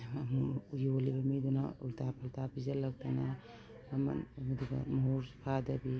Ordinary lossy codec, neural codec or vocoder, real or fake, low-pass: none; none; real; none